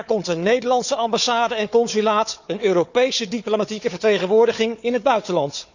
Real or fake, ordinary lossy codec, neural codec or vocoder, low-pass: fake; none; codec, 16 kHz, 4 kbps, FunCodec, trained on Chinese and English, 50 frames a second; 7.2 kHz